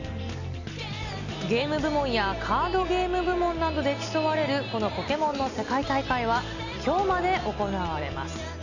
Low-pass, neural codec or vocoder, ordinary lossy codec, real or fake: 7.2 kHz; none; none; real